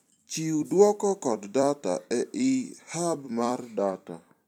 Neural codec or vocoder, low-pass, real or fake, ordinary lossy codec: vocoder, 44.1 kHz, 128 mel bands every 256 samples, BigVGAN v2; 19.8 kHz; fake; none